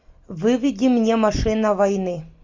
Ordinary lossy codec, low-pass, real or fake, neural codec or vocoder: MP3, 64 kbps; 7.2 kHz; real; none